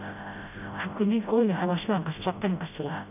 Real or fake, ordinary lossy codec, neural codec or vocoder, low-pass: fake; none; codec, 16 kHz, 0.5 kbps, FreqCodec, smaller model; 3.6 kHz